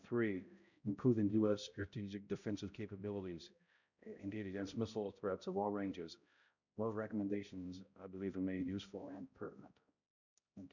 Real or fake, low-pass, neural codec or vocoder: fake; 7.2 kHz; codec, 16 kHz, 0.5 kbps, X-Codec, HuBERT features, trained on balanced general audio